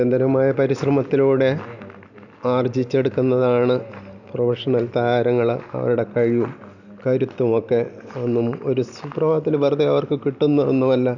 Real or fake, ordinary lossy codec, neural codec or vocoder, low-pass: real; none; none; 7.2 kHz